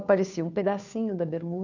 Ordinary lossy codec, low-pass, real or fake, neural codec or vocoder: none; 7.2 kHz; fake; codec, 16 kHz, 2 kbps, FunCodec, trained on Chinese and English, 25 frames a second